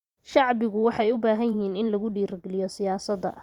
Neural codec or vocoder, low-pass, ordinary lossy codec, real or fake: vocoder, 44.1 kHz, 128 mel bands every 512 samples, BigVGAN v2; 19.8 kHz; Opus, 64 kbps; fake